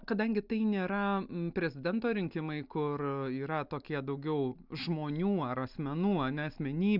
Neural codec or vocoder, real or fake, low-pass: none; real; 5.4 kHz